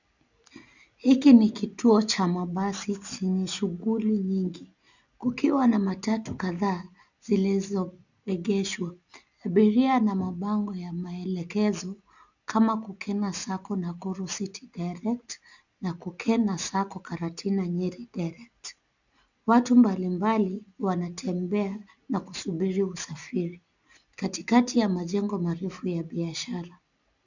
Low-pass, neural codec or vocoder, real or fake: 7.2 kHz; none; real